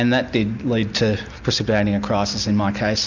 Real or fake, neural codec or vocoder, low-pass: real; none; 7.2 kHz